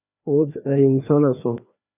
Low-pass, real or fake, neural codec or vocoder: 3.6 kHz; fake; codec, 16 kHz, 2 kbps, FreqCodec, larger model